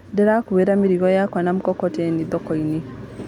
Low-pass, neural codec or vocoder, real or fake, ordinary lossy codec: 19.8 kHz; none; real; none